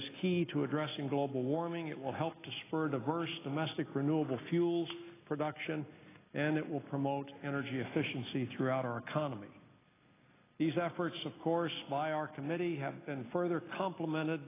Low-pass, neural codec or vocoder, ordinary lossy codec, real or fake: 3.6 kHz; none; AAC, 16 kbps; real